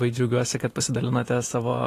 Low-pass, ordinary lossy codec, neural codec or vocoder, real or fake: 14.4 kHz; AAC, 48 kbps; none; real